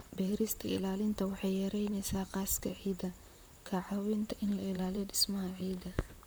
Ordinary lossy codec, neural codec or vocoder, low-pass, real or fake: none; vocoder, 44.1 kHz, 128 mel bands, Pupu-Vocoder; none; fake